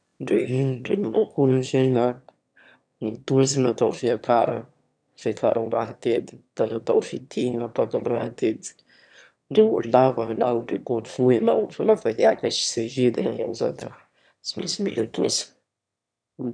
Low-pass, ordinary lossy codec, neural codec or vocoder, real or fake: 9.9 kHz; none; autoencoder, 22.05 kHz, a latent of 192 numbers a frame, VITS, trained on one speaker; fake